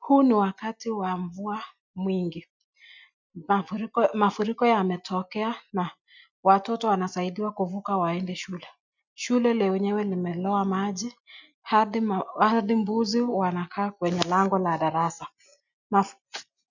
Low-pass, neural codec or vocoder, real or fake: 7.2 kHz; none; real